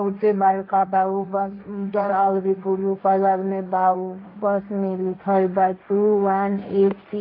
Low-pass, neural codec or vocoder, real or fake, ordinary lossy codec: 5.4 kHz; codec, 16 kHz, 1.1 kbps, Voila-Tokenizer; fake; AAC, 32 kbps